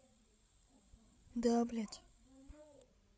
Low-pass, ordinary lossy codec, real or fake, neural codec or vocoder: none; none; fake; codec, 16 kHz, 16 kbps, FreqCodec, larger model